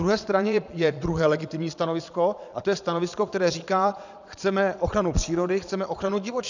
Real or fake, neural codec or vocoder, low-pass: fake; vocoder, 22.05 kHz, 80 mel bands, Vocos; 7.2 kHz